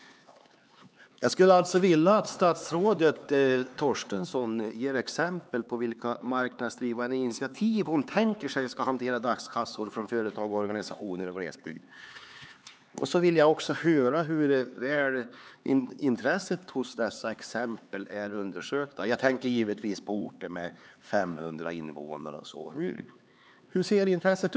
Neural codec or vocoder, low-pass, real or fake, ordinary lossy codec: codec, 16 kHz, 4 kbps, X-Codec, HuBERT features, trained on LibriSpeech; none; fake; none